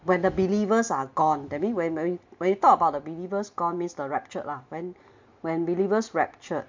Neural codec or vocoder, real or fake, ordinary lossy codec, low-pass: none; real; MP3, 48 kbps; 7.2 kHz